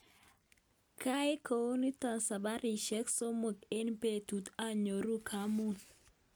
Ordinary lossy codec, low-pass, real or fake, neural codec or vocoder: none; none; real; none